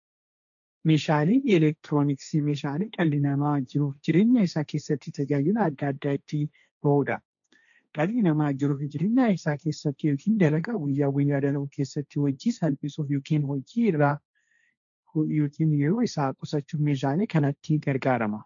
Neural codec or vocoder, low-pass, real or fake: codec, 16 kHz, 1.1 kbps, Voila-Tokenizer; 7.2 kHz; fake